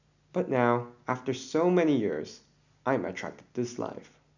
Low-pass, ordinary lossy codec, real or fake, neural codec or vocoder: 7.2 kHz; none; real; none